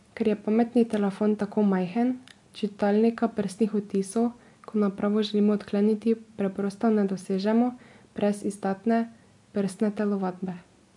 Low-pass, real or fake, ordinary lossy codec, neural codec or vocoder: 10.8 kHz; real; MP3, 64 kbps; none